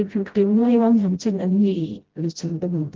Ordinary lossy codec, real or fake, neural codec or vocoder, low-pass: Opus, 16 kbps; fake; codec, 16 kHz, 0.5 kbps, FreqCodec, smaller model; 7.2 kHz